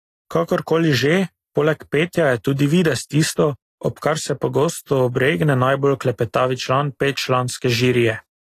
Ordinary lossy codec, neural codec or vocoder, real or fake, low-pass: AAC, 48 kbps; none; real; 14.4 kHz